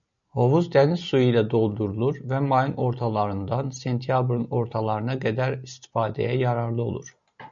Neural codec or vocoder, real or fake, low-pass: none; real; 7.2 kHz